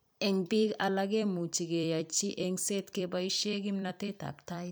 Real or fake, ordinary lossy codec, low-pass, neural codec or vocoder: fake; none; none; vocoder, 44.1 kHz, 128 mel bands every 256 samples, BigVGAN v2